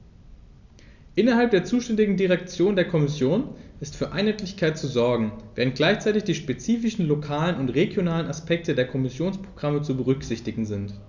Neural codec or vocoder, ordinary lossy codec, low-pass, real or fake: none; none; 7.2 kHz; real